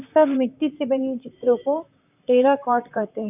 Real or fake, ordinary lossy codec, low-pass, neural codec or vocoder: fake; none; 3.6 kHz; vocoder, 44.1 kHz, 128 mel bands, Pupu-Vocoder